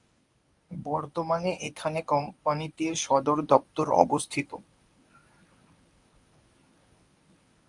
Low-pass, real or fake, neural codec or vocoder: 10.8 kHz; fake; codec, 24 kHz, 0.9 kbps, WavTokenizer, medium speech release version 1